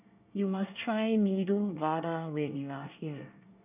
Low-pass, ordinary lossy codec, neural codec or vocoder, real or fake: 3.6 kHz; none; codec, 24 kHz, 1 kbps, SNAC; fake